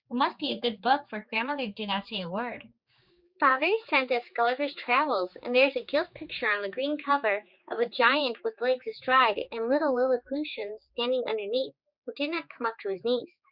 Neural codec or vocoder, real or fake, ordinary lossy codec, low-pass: codec, 16 kHz, 4 kbps, X-Codec, HuBERT features, trained on general audio; fake; Opus, 64 kbps; 5.4 kHz